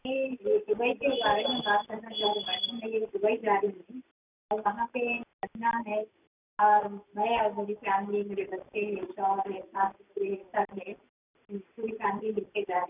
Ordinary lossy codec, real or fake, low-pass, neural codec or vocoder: none; real; 3.6 kHz; none